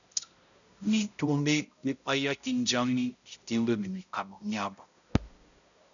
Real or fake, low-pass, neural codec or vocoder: fake; 7.2 kHz; codec, 16 kHz, 0.5 kbps, X-Codec, HuBERT features, trained on balanced general audio